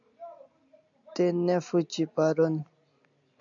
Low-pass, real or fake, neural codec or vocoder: 7.2 kHz; real; none